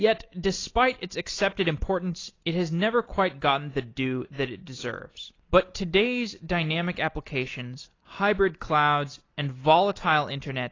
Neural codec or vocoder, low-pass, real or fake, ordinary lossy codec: none; 7.2 kHz; real; AAC, 32 kbps